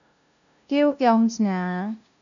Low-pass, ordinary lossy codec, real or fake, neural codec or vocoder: 7.2 kHz; MP3, 96 kbps; fake; codec, 16 kHz, 0.5 kbps, FunCodec, trained on LibriTTS, 25 frames a second